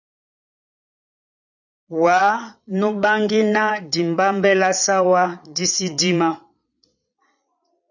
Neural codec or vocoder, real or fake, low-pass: vocoder, 22.05 kHz, 80 mel bands, Vocos; fake; 7.2 kHz